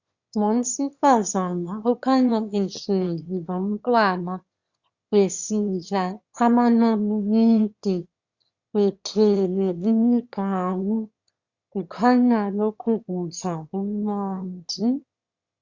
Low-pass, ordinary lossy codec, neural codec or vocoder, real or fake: 7.2 kHz; Opus, 64 kbps; autoencoder, 22.05 kHz, a latent of 192 numbers a frame, VITS, trained on one speaker; fake